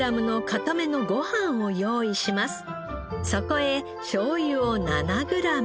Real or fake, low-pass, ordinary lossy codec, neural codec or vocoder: real; none; none; none